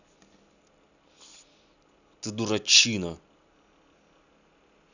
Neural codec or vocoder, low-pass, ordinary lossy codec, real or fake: none; 7.2 kHz; none; real